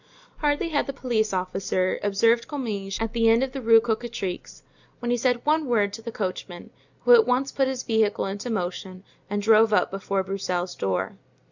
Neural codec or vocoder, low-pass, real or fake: none; 7.2 kHz; real